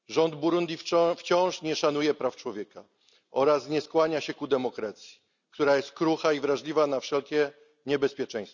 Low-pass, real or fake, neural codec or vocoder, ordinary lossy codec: 7.2 kHz; real; none; none